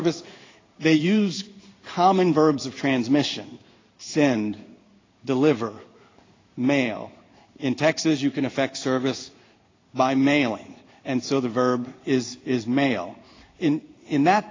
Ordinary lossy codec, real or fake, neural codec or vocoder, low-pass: AAC, 32 kbps; fake; codec, 16 kHz in and 24 kHz out, 1 kbps, XY-Tokenizer; 7.2 kHz